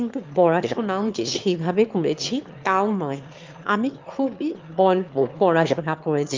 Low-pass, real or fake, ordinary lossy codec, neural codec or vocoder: 7.2 kHz; fake; Opus, 32 kbps; autoencoder, 22.05 kHz, a latent of 192 numbers a frame, VITS, trained on one speaker